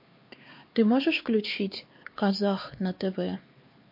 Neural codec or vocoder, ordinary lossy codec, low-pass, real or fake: codec, 16 kHz, 4 kbps, X-Codec, HuBERT features, trained on LibriSpeech; MP3, 32 kbps; 5.4 kHz; fake